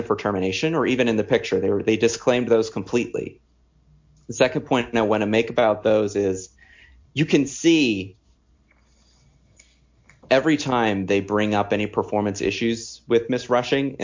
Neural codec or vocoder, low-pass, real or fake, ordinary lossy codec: none; 7.2 kHz; real; MP3, 48 kbps